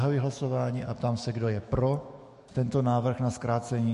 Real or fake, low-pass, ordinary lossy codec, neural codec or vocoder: fake; 14.4 kHz; MP3, 48 kbps; autoencoder, 48 kHz, 128 numbers a frame, DAC-VAE, trained on Japanese speech